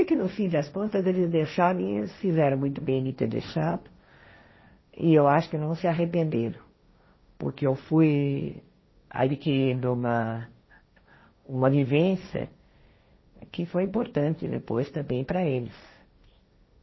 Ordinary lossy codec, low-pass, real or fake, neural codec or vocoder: MP3, 24 kbps; 7.2 kHz; fake; codec, 16 kHz, 1.1 kbps, Voila-Tokenizer